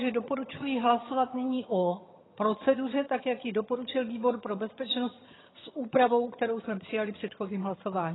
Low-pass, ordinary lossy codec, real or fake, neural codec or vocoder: 7.2 kHz; AAC, 16 kbps; fake; vocoder, 22.05 kHz, 80 mel bands, HiFi-GAN